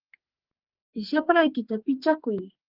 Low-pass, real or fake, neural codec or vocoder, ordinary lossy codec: 5.4 kHz; fake; codec, 44.1 kHz, 2.6 kbps, SNAC; Opus, 32 kbps